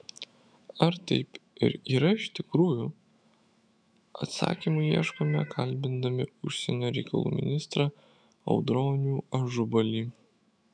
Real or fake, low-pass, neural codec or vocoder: fake; 9.9 kHz; autoencoder, 48 kHz, 128 numbers a frame, DAC-VAE, trained on Japanese speech